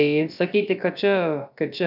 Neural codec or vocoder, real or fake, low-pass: codec, 16 kHz, about 1 kbps, DyCAST, with the encoder's durations; fake; 5.4 kHz